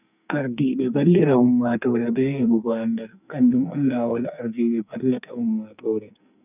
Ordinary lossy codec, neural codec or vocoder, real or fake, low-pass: none; codec, 44.1 kHz, 2.6 kbps, SNAC; fake; 3.6 kHz